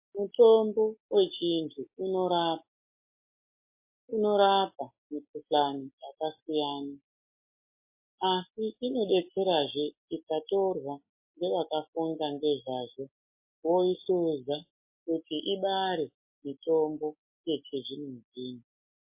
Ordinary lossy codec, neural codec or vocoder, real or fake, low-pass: MP3, 24 kbps; none; real; 3.6 kHz